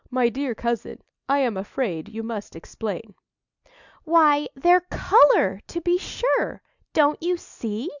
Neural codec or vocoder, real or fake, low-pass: none; real; 7.2 kHz